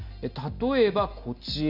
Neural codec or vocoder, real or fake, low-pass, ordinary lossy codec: none; real; 5.4 kHz; none